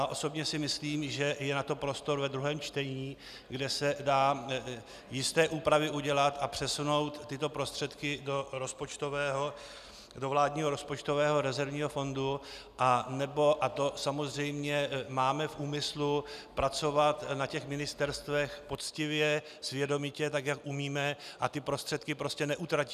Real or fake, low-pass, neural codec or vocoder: real; 14.4 kHz; none